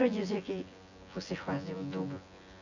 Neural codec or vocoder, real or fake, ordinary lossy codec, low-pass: vocoder, 24 kHz, 100 mel bands, Vocos; fake; none; 7.2 kHz